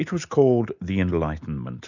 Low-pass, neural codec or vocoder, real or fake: 7.2 kHz; none; real